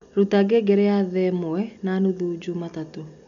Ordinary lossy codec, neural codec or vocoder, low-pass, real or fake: none; none; 7.2 kHz; real